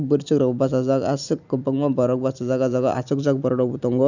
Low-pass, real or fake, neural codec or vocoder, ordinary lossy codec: 7.2 kHz; real; none; none